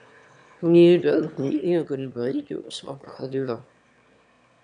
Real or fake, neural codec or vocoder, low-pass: fake; autoencoder, 22.05 kHz, a latent of 192 numbers a frame, VITS, trained on one speaker; 9.9 kHz